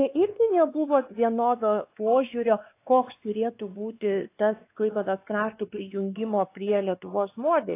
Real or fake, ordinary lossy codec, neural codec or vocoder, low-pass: fake; AAC, 24 kbps; codec, 16 kHz, 2 kbps, X-Codec, WavLM features, trained on Multilingual LibriSpeech; 3.6 kHz